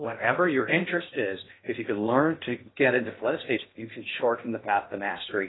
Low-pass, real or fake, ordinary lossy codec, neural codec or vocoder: 7.2 kHz; fake; AAC, 16 kbps; codec, 16 kHz in and 24 kHz out, 0.6 kbps, FocalCodec, streaming, 2048 codes